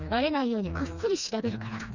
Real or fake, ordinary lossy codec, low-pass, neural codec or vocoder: fake; none; 7.2 kHz; codec, 16 kHz, 2 kbps, FreqCodec, smaller model